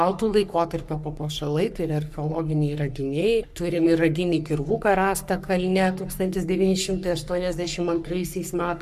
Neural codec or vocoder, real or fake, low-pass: codec, 44.1 kHz, 3.4 kbps, Pupu-Codec; fake; 14.4 kHz